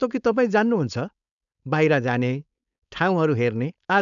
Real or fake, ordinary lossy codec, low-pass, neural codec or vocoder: fake; none; 7.2 kHz; codec, 16 kHz, 4.8 kbps, FACodec